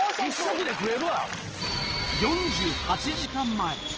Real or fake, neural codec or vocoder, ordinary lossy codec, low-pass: real; none; Opus, 16 kbps; 7.2 kHz